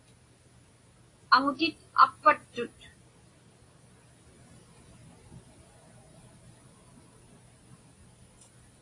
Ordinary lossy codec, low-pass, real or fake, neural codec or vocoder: AAC, 32 kbps; 10.8 kHz; real; none